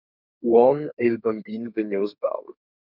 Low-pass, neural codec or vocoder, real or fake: 5.4 kHz; codec, 32 kHz, 1.9 kbps, SNAC; fake